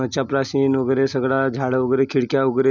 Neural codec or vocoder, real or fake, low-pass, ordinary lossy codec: none; real; 7.2 kHz; none